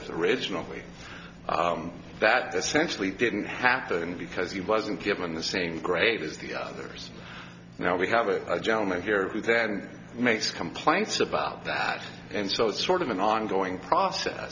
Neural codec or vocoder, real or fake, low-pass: none; real; 7.2 kHz